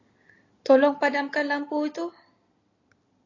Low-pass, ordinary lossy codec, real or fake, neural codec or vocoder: 7.2 kHz; AAC, 32 kbps; real; none